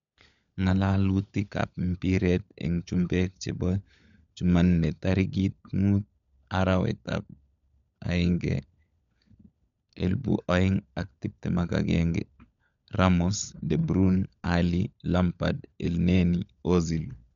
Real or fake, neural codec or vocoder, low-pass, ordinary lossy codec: fake; codec, 16 kHz, 16 kbps, FunCodec, trained on LibriTTS, 50 frames a second; 7.2 kHz; none